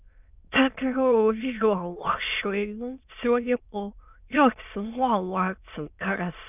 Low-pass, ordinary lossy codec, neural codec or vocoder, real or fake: 3.6 kHz; none; autoencoder, 22.05 kHz, a latent of 192 numbers a frame, VITS, trained on many speakers; fake